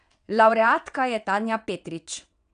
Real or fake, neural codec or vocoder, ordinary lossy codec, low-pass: fake; vocoder, 22.05 kHz, 80 mel bands, WaveNeXt; none; 9.9 kHz